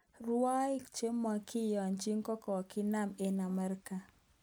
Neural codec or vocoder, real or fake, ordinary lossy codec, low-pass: none; real; none; none